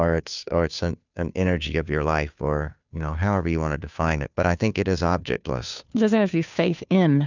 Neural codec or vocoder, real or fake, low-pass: codec, 16 kHz, 2 kbps, FunCodec, trained on Chinese and English, 25 frames a second; fake; 7.2 kHz